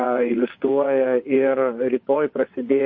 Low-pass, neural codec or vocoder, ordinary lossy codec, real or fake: 7.2 kHz; vocoder, 22.05 kHz, 80 mel bands, WaveNeXt; MP3, 32 kbps; fake